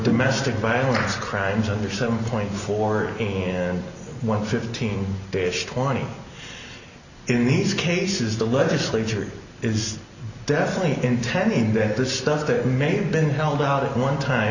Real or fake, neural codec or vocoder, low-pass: real; none; 7.2 kHz